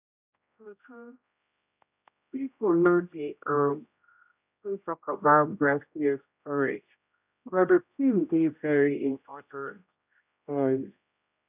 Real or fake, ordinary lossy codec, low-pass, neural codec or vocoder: fake; none; 3.6 kHz; codec, 16 kHz, 0.5 kbps, X-Codec, HuBERT features, trained on general audio